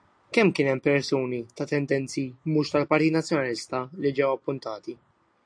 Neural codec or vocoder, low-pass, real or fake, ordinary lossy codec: none; 9.9 kHz; real; AAC, 64 kbps